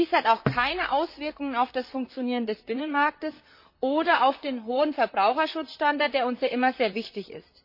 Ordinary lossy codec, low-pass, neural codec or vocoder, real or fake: MP3, 32 kbps; 5.4 kHz; vocoder, 44.1 kHz, 128 mel bands, Pupu-Vocoder; fake